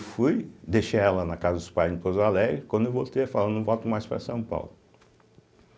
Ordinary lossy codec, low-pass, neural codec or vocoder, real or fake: none; none; none; real